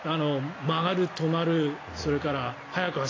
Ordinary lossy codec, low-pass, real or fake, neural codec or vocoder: AAC, 32 kbps; 7.2 kHz; real; none